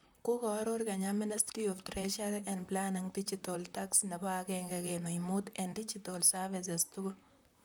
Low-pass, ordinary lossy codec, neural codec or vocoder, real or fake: none; none; vocoder, 44.1 kHz, 128 mel bands, Pupu-Vocoder; fake